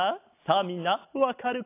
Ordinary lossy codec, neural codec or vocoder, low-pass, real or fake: AAC, 24 kbps; none; 3.6 kHz; real